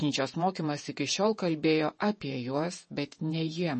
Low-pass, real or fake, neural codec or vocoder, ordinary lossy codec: 10.8 kHz; fake; vocoder, 48 kHz, 128 mel bands, Vocos; MP3, 32 kbps